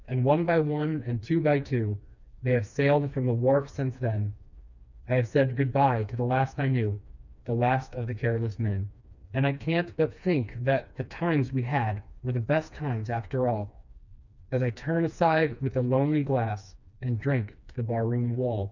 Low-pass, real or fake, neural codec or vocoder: 7.2 kHz; fake; codec, 16 kHz, 2 kbps, FreqCodec, smaller model